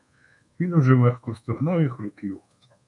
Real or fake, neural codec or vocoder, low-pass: fake; codec, 24 kHz, 1.2 kbps, DualCodec; 10.8 kHz